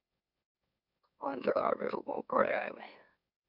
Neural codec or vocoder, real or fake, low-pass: autoencoder, 44.1 kHz, a latent of 192 numbers a frame, MeloTTS; fake; 5.4 kHz